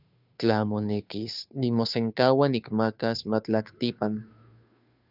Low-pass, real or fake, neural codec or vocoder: 5.4 kHz; fake; codec, 16 kHz, 2 kbps, FunCodec, trained on Chinese and English, 25 frames a second